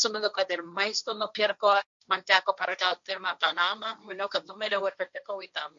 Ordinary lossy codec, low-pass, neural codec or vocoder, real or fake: MP3, 64 kbps; 7.2 kHz; codec, 16 kHz, 1.1 kbps, Voila-Tokenizer; fake